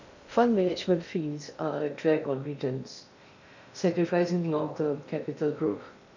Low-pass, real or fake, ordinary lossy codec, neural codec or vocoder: 7.2 kHz; fake; none; codec, 16 kHz in and 24 kHz out, 0.6 kbps, FocalCodec, streaming, 2048 codes